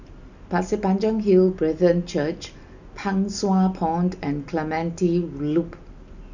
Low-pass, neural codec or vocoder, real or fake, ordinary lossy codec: 7.2 kHz; none; real; none